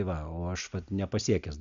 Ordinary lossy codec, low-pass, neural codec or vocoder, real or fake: AAC, 96 kbps; 7.2 kHz; none; real